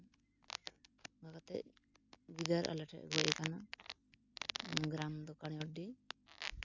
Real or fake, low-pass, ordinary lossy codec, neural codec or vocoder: real; 7.2 kHz; none; none